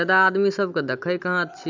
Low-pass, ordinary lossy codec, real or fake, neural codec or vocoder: 7.2 kHz; none; real; none